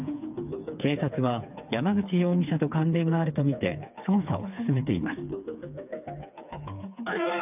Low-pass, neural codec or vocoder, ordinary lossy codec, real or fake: 3.6 kHz; codec, 16 kHz, 4 kbps, FreqCodec, smaller model; none; fake